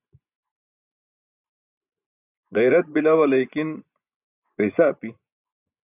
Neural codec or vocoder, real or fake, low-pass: vocoder, 24 kHz, 100 mel bands, Vocos; fake; 3.6 kHz